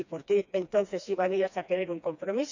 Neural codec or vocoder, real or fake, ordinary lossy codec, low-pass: codec, 16 kHz, 2 kbps, FreqCodec, smaller model; fake; none; 7.2 kHz